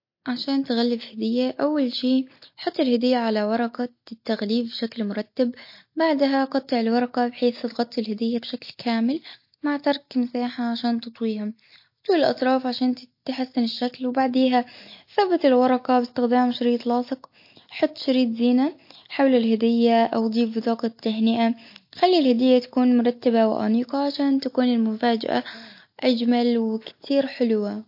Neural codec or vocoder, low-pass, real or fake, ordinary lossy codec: none; 5.4 kHz; real; MP3, 32 kbps